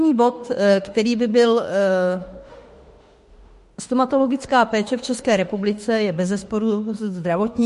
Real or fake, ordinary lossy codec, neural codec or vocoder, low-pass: fake; MP3, 48 kbps; autoencoder, 48 kHz, 32 numbers a frame, DAC-VAE, trained on Japanese speech; 14.4 kHz